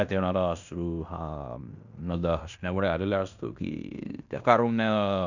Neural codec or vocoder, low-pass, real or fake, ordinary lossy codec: codec, 16 kHz in and 24 kHz out, 0.9 kbps, LongCat-Audio-Codec, fine tuned four codebook decoder; 7.2 kHz; fake; none